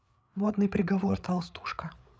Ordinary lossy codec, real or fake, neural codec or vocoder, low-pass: none; fake; codec, 16 kHz, 8 kbps, FreqCodec, larger model; none